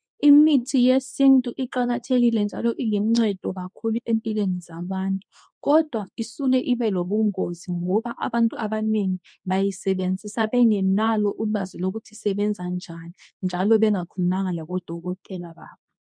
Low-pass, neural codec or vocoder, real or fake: 9.9 kHz; codec, 24 kHz, 0.9 kbps, WavTokenizer, medium speech release version 2; fake